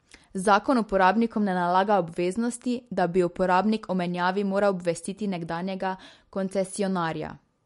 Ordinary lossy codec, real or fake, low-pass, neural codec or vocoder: MP3, 48 kbps; real; 14.4 kHz; none